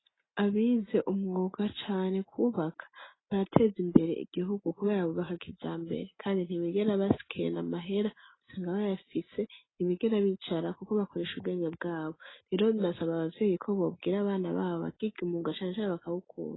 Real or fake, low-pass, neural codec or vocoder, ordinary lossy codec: real; 7.2 kHz; none; AAC, 16 kbps